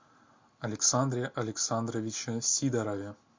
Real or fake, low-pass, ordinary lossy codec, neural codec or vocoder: real; 7.2 kHz; MP3, 48 kbps; none